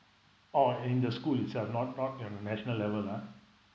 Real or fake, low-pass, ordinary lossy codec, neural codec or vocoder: real; none; none; none